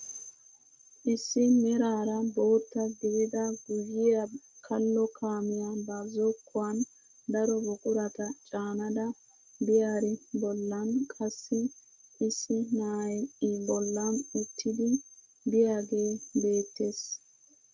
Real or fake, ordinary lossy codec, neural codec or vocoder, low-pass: real; Opus, 32 kbps; none; 7.2 kHz